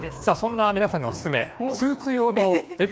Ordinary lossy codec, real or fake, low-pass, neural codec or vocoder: none; fake; none; codec, 16 kHz, 2 kbps, FreqCodec, larger model